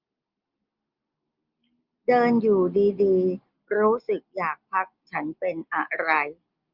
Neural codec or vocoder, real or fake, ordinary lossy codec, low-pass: none; real; Opus, 24 kbps; 5.4 kHz